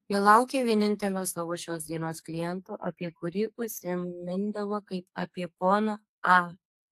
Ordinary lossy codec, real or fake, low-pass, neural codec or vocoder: AAC, 64 kbps; fake; 14.4 kHz; codec, 44.1 kHz, 2.6 kbps, SNAC